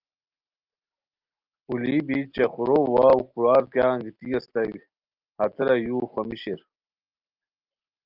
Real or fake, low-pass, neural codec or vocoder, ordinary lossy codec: real; 5.4 kHz; none; Opus, 32 kbps